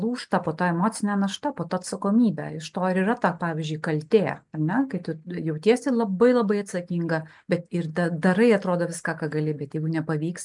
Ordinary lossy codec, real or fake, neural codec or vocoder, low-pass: MP3, 96 kbps; real; none; 10.8 kHz